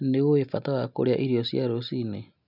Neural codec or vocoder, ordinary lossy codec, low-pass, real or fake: none; none; 5.4 kHz; real